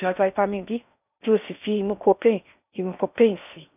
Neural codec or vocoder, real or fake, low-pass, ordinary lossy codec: codec, 16 kHz in and 24 kHz out, 0.6 kbps, FocalCodec, streaming, 4096 codes; fake; 3.6 kHz; none